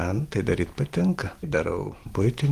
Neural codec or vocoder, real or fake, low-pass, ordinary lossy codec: none; real; 14.4 kHz; Opus, 24 kbps